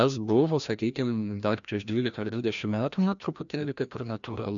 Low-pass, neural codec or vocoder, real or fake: 7.2 kHz; codec, 16 kHz, 1 kbps, FreqCodec, larger model; fake